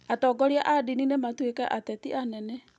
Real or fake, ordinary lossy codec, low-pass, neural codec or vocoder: real; none; none; none